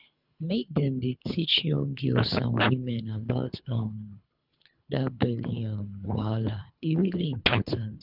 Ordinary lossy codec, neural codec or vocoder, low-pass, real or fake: none; codec, 24 kHz, 3 kbps, HILCodec; 5.4 kHz; fake